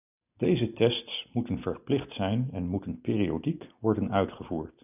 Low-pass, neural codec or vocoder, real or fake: 3.6 kHz; none; real